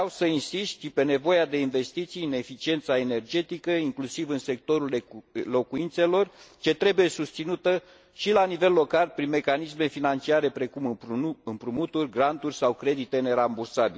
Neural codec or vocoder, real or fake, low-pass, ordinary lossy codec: none; real; none; none